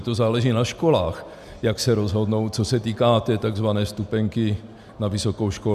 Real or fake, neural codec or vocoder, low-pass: real; none; 14.4 kHz